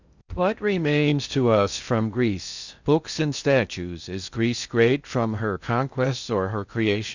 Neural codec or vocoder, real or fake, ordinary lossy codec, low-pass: codec, 16 kHz in and 24 kHz out, 0.6 kbps, FocalCodec, streaming, 2048 codes; fake; Opus, 64 kbps; 7.2 kHz